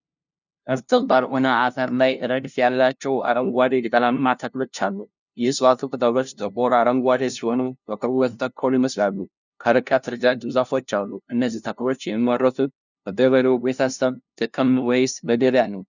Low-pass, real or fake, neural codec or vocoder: 7.2 kHz; fake; codec, 16 kHz, 0.5 kbps, FunCodec, trained on LibriTTS, 25 frames a second